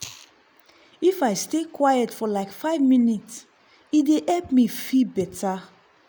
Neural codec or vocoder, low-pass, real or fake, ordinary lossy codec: none; none; real; none